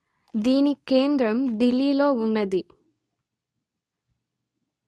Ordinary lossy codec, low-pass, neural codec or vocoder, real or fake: none; none; codec, 24 kHz, 0.9 kbps, WavTokenizer, medium speech release version 2; fake